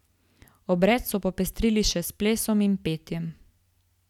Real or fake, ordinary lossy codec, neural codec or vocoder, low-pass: real; none; none; 19.8 kHz